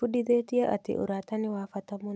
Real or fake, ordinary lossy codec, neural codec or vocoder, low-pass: real; none; none; none